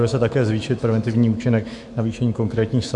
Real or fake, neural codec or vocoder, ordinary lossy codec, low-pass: fake; autoencoder, 48 kHz, 128 numbers a frame, DAC-VAE, trained on Japanese speech; MP3, 64 kbps; 10.8 kHz